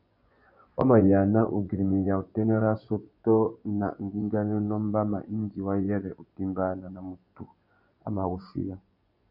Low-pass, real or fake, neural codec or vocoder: 5.4 kHz; fake; vocoder, 24 kHz, 100 mel bands, Vocos